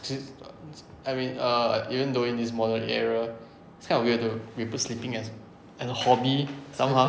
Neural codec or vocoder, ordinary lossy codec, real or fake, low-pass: none; none; real; none